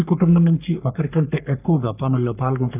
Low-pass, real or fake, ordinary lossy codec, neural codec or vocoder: 3.6 kHz; fake; none; codec, 44.1 kHz, 3.4 kbps, Pupu-Codec